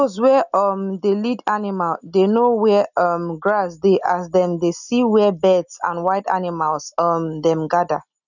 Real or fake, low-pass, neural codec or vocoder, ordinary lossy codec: real; 7.2 kHz; none; none